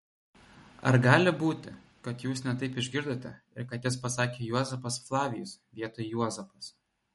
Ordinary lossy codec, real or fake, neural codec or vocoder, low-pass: MP3, 48 kbps; real; none; 19.8 kHz